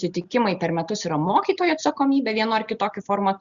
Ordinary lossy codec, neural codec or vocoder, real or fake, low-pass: Opus, 64 kbps; none; real; 7.2 kHz